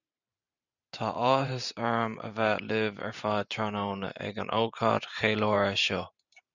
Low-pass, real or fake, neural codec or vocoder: 7.2 kHz; fake; vocoder, 44.1 kHz, 128 mel bands every 512 samples, BigVGAN v2